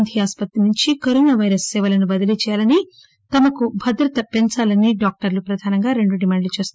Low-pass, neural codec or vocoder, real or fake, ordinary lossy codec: none; none; real; none